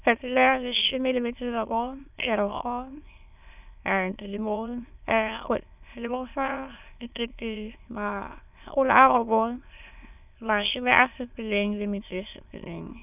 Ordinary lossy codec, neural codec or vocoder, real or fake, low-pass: none; autoencoder, 22.05 kHz, a latent of 192 numbers a frame, VITS, trained on many speakers; fake; 3.6 kHz